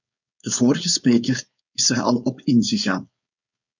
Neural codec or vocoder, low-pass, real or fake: codec, 16 kHz, 4.8 kbps, FACodec; 7.2 kHz; fake